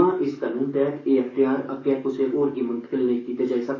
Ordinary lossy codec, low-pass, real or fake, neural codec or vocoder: AAC, 32 kbps; 7.2 kHz; fake; codec, 44.1 kHz, 7.8 kbps, DAC